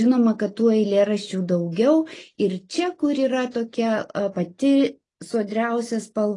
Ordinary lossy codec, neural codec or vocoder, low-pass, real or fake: AAC, 32 kbps; none; 10.8 kHz; real